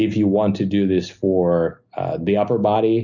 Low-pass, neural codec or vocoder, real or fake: 7.2 kHz; none; real